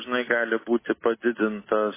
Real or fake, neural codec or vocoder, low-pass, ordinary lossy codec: real; none; 3.6 kHz; MP3, 16 kbps